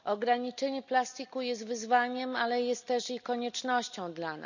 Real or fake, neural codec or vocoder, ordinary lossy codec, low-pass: real; none; none; 7.2 kHz